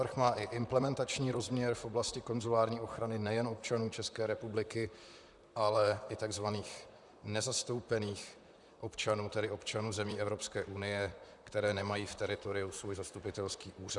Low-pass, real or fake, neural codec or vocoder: 10.8 kHz; fake; vocoder, 44.1 kHz, 128 mel bands, Pupu-Vocoder